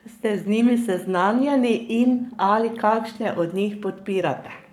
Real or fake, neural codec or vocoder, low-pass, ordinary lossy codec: fake; codec, 44.1 kHz, 7.8 kbps, Pupu-Codec; 19.8 kHz; none